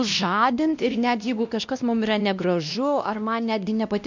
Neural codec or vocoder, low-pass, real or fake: codec, 16 kHz, 1 kbps, X-Codec, WavLM features, trained on Multilingual LibriSpeech; 7.2 kHz; fake